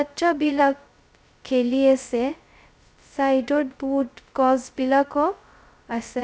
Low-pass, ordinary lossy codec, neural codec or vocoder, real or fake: none; none; codec, 16 kHz, 0.2 kbps, FocalCodec; fake